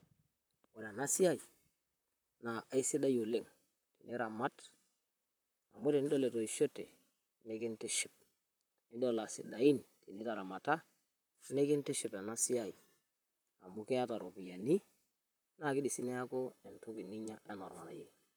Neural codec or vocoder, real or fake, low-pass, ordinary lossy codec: vocoder, 44.1 kHz, 128 mel bands, Pupu-Vocoder; fake; none; none